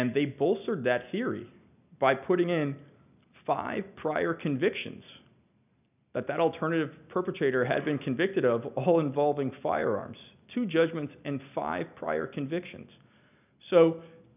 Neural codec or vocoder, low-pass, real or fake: none; 3.6 kHz; real